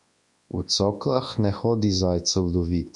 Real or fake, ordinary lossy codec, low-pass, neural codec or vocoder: fake; MP3, 64 kbps; 10.8 kHz; codec, 24 kHz, 0.9 kbps, WavTokenizer, large speech release